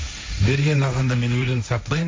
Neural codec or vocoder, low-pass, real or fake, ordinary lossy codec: codec, 16 kHz, 1.1 kbps, Voila-Tokenizer; none; fake; none